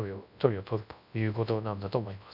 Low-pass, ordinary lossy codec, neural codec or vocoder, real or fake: 5.4 kHz; none; codec, 24 kHz, 0.9 kbps, WavTokenizer, large speech release; fake